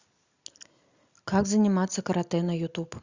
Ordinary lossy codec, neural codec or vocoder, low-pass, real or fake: Opus, 64 kbps; none; 7.2 kHz; real